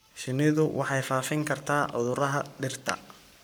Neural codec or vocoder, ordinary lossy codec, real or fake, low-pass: codec, 44.1 kHz, 7.8 kbps, Pupu-Codec; none; fake; none